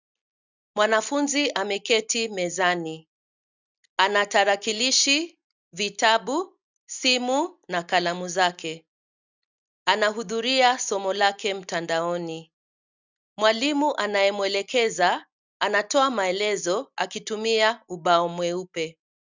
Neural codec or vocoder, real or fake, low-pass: none; real; 7.2 kHz